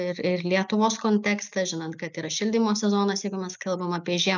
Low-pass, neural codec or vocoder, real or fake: 7.2 kHz; none; real